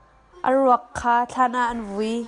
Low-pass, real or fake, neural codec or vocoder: 10.8 kHz; real; none